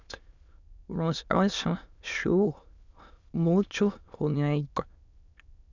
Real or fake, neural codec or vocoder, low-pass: fake; autoencoder, 22.05 kHz, a latent of 192 numbers a frame, VITS, trained on many speakers; 7.2 kHz